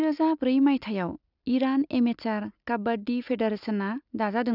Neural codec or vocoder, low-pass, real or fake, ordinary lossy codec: none; 5.4 kHz; real; none